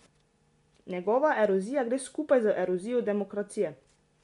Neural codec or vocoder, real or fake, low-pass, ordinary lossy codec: none; real; 10.8 kHz; MP3, 64 kbps